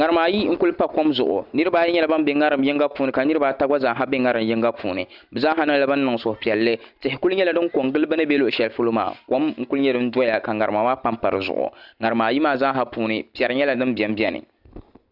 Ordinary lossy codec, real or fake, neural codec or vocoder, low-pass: Opus, 64 kbps; real; none; 5.4 kHz